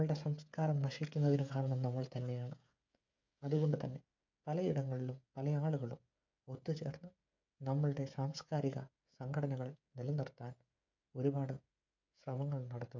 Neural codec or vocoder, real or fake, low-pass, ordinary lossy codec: codec, 16 kHz, 16 kbps, FreqCodec, smaller model; fake; 7.2 kHz; MP3, 64 kbps